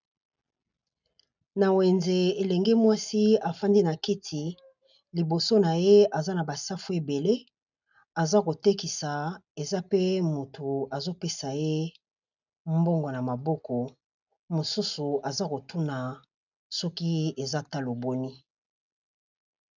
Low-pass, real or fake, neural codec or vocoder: 7.2 kHz; real; none